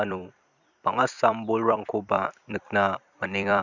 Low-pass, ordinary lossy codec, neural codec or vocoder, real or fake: 7.2 kHz; Opus, 64 kbps; vocoder, 44.1 kHz, 128 mel bands every 256 samples, BigVGAN v2; fake